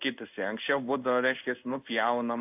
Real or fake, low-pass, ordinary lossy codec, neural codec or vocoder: fake; 3.6 kHz; AAC, 32 kbps; codec, 16 kHz in and 24 kHz out, 1 kbps, XY-Tokenizer